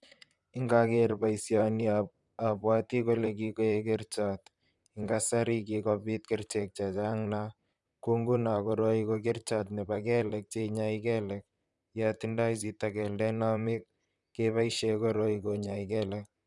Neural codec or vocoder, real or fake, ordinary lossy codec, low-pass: vocoder, 44.1 kHz, 128 mel bands, Pupu-Vocoder; fake; none; 10.8 kHz